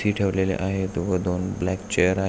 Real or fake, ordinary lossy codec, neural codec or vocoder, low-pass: real; none; none; none